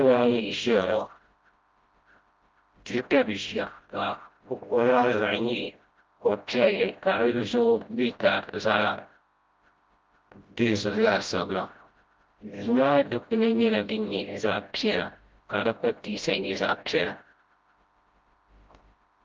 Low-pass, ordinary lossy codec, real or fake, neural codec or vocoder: 7.2 kHz; Opus, 24 kbps; fake; codec, 16 kHz, 0.5 kbps, FreqCodec, smaller model